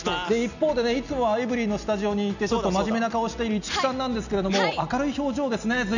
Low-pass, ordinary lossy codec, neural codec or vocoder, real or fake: 7.2 kHz; none; none; real